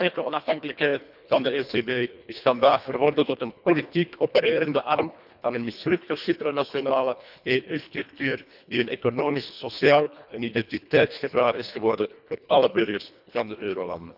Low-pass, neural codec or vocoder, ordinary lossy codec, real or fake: 5.4 kHz; codec, 24 kHz, 1.5 kbps, HILCodec; none; fake